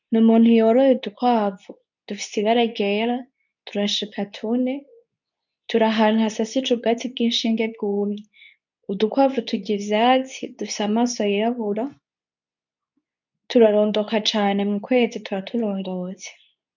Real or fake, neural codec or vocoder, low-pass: fake; codec, 24 kHz, 0.9 kbps, WavTokenizer, medium speech release version 2; 7.2 kHz